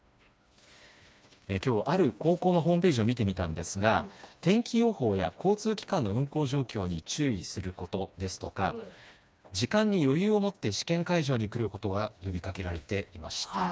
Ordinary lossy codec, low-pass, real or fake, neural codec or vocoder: none; none; fake; codec, 16 kHz, 2 kbps, FreqCodec, smaller model